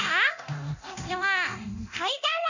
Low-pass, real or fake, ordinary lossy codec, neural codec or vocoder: 7.2 kHz; fake; none; codec, 24 kHz, 0.9 kbps, DualCodec